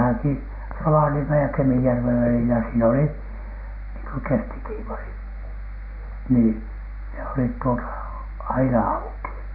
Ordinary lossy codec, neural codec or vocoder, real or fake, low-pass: none; none; real; 5.4 kHz